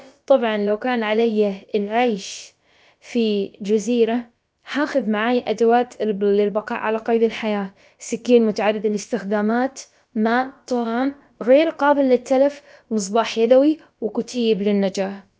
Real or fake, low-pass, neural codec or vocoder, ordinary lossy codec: fake; none; codec, 16 kHz, about 1 kbps, DyCAST, with the encoder's durations; none